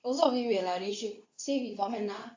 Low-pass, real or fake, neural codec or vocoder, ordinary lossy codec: 7.2 kHz; fake; codec, 24 kHz, 0.9 kbps, WavTokenizer, medium speech release version 2; AAC, 48 kbps